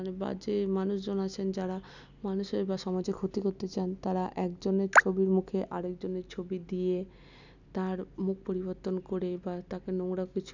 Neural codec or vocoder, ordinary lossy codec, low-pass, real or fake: none; none; 7.2 kHz; real